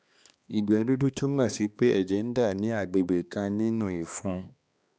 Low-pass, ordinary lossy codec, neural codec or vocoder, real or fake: none; none; codec, 16 kHz, 2 kbps, X-Codec, HuBERT features, trained on balanced general audio; fake